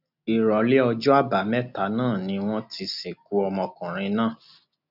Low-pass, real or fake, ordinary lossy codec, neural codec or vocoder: 5.4 kHz; real; none; none